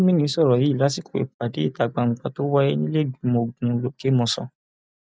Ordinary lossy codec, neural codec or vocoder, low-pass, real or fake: none; none; none; real